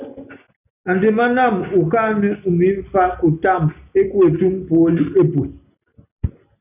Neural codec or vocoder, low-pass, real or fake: none; 3.6 kHz; real